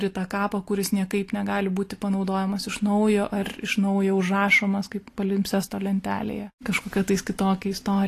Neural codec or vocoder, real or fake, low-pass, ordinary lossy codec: none; real; 14.4 kHz; AAC, 48 kbps